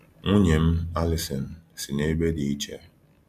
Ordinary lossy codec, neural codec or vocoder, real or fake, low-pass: MP3, 96 kbps; none; real; 14.4 kHz